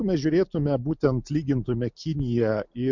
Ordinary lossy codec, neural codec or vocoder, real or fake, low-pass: MP3, 64 kbps; none; real; 7.2 kHz